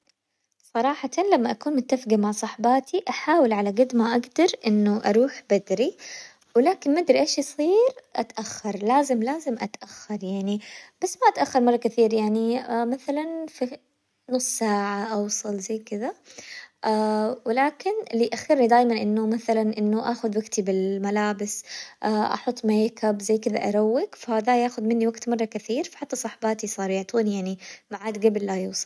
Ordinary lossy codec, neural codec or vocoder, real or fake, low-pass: none; none; real; none